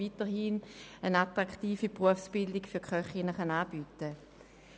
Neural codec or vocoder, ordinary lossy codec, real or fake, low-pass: none; none; real; none